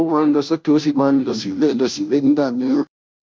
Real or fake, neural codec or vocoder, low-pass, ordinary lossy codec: fake; codec, 16 kHz, 0.5 kbps, FunCodec, trained on Chinese and English, 25 frames a second; none; none